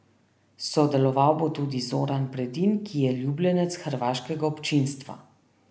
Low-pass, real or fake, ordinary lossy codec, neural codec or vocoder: none; real; none; none